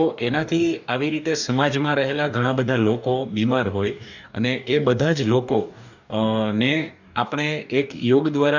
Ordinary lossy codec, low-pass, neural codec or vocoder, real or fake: none; 7.2 kHz; codec, 44.1 kHz, 2.6 kbps, DAC; fake